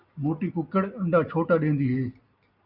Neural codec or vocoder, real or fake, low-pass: none; real; 5.4 kHz